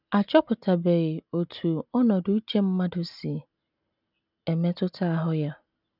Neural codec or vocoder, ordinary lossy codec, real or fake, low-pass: none; none; real; 5.4 kHz